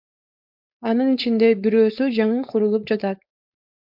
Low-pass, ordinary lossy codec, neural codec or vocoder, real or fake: 5.4 kHz; MP3, 48 kbps; codec, 16 kHz, 4.8 kbps, FACodec; fake